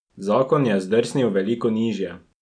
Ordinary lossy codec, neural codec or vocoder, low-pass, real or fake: none; none; 9.9 kHz; real